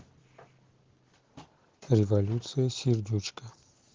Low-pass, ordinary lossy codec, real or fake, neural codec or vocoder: 7.2 kHz; Opus, 16 kbps; real; none